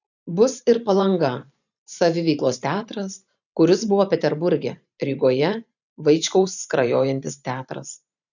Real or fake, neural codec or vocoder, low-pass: fake; vocoder, 44.1 kHz, 128 mel bands every 512 samples, BigVGAN v2; 7.2 kHz